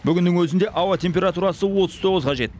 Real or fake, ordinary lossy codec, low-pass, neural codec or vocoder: real; none; none; none